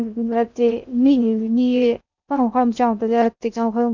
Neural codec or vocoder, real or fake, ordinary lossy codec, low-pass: codec, 16 kHz in and 24 kHz out, 0.6 kbps, FocalCodec, streaming, 2048 codes; fake; none; 7.2 kHz